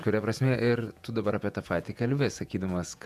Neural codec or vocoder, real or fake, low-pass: vocoder, 48 kHz, 128 mel bands, Vocos; fake; 14.4 kHz